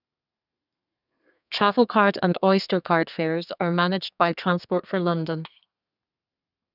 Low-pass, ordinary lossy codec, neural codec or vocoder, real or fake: 5.4 kHz; none; codec, 32 kHz, 1.9 kbps, SNAC; fake